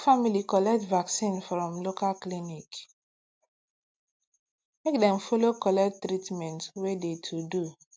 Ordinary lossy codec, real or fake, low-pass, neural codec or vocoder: none; real; none; none